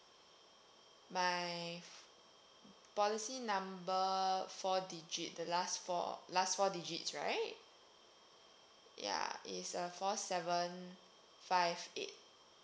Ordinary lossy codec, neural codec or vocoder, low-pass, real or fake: none; none; none; real